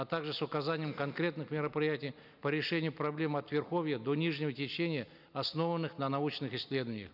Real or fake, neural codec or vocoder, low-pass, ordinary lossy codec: real; none; 5.4 kHz; none